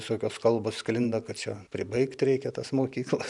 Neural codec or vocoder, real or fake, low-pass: vocoder, 44.1 kHz, 128 mel bands every 512 samples, BigVGAN v2; fake; 10.8 kHz